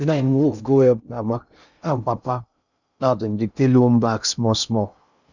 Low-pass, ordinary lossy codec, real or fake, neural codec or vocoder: 7.2 kHz; none; fake; codec, 16 kHz in and 24 kHz out, 0.6 kbps, FocalCodec, streaming, 2048 codes